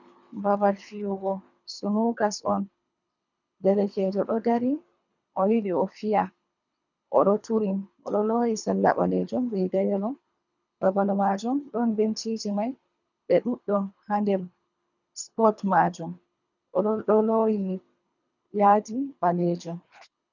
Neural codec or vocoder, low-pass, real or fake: codec, 24 kHz, 3 kbps, HILCodec; 7.2 kHz; fake